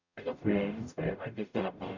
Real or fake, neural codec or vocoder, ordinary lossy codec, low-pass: fake; codec, 44.1 kHz, 0.9 kbps, DAC; none; 7.2 kHz